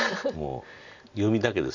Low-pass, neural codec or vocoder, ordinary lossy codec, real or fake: 7.2 kHz; none; none; real